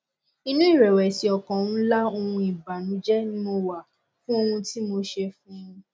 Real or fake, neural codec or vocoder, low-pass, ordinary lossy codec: real; none; none; none